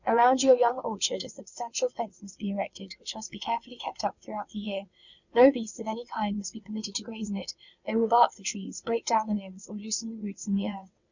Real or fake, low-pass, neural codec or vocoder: fake; 7.2 kHz; vocoder, 22.05 kHz, 80 mel bands, WaveNeXt